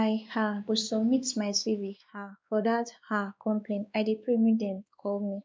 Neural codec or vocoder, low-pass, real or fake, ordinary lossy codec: codec, 16 kHz, 2 kbps, X-Codec, WavLM features, trained on Multilingual LibriSpeech; none; fake; none